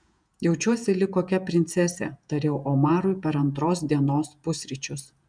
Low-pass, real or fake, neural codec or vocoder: 9.9 kHz; fake; vocoder, 48 kHz, 128 mel bands, Vocos